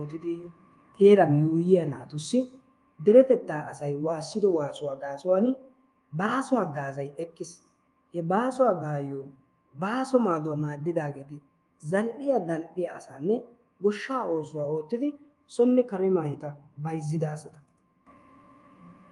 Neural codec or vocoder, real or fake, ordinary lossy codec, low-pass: codec, 24 kHz, 1.2 kbps, DualCodec; fake; Opus, 32 kbps; 10.8 kHz